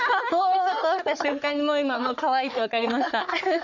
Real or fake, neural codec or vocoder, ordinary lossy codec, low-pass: fake; codec, 44.1 kHz, 3.4 kbps, Pupu-Codec; none; 7.2 kHz